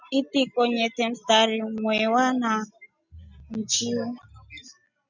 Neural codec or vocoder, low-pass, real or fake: none; 7.2 kHz; real